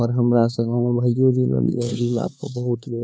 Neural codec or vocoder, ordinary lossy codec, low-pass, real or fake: codec, 16 kHz, 4 kbps, X-Codec, HuBERT features, trained on LibriSpeech; none; none; fake